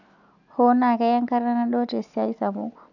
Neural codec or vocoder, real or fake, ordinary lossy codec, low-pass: none; real; none; 7.2 kHz